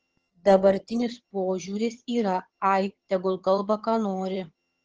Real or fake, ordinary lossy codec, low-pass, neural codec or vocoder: fake; Opus, 16 kbps; 7.2 kHz; vocoder, 22.05 kHz, 80 mel bands, HiFi-GAN